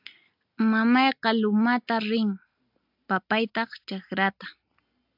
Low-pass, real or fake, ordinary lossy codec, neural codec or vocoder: 5.4 kHz; real; AAC, 48 kbps; none